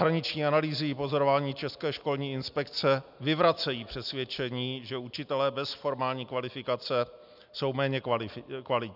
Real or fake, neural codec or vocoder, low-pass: real; none; 5.4 kHz